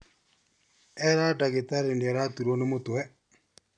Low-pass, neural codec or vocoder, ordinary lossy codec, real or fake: 9.9 kHz; none; none; real